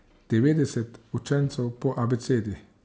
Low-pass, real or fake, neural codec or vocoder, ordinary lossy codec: none; real; none; none